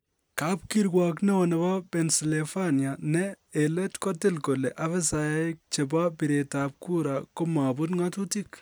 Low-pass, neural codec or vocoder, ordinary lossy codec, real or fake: none; none; none; real